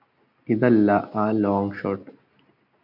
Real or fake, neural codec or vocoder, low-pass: real; none; 5.4 kHz